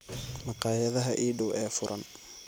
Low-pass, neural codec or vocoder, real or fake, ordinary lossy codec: none; none; real; none